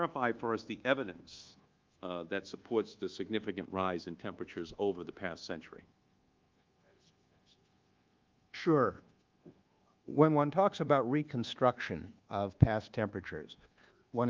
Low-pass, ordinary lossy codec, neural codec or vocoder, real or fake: 7.2 kHz; Opus, 32 kbps; codec, 24 kHz, 1.2 kbps, DualCodec; fake